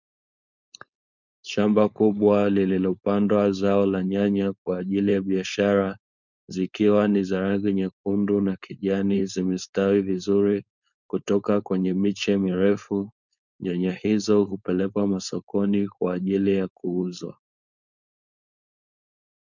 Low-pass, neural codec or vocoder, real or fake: 7.2 kHz; codec, 16 kHz, 4.8 kbps, FACodec; fake